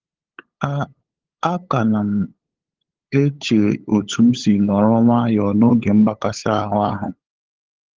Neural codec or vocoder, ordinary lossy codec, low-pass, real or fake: codec, 16 kHz, 8 kbps, FunCodec, trained on LibriTTS, 25 frames a second; Opus, 16 kbps; 7.2 kHz; fake